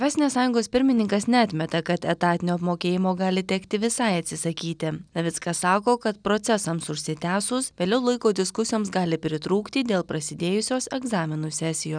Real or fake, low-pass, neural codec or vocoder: real; 9.9 kHz; none